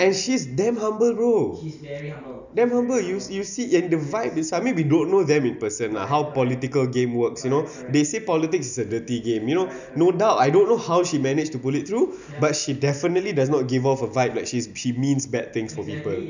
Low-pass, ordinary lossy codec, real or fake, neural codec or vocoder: 7.2 kHz; none; real; none